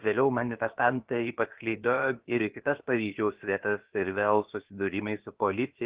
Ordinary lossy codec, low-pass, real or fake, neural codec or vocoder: Opus, 16 kbps; 3.6 kHz; fake; codec, 16 kHz, about 1 kbps, DyCAST, with the encoder's durations